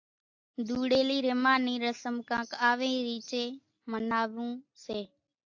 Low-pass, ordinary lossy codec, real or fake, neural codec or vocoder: 7.2 kHz; AAC, 48 kbps; real; none